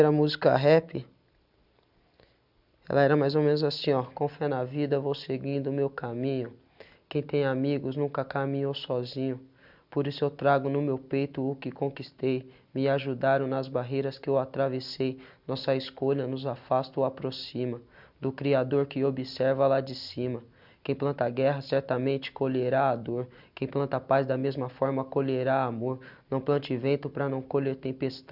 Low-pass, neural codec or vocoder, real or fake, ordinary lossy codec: 5.4 kHz; none; real; none